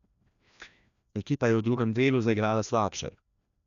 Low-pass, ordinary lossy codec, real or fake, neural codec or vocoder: 7.2 kHz; none; fake; codec, 16 kHz, 1 kbps, FreqCodec, larger model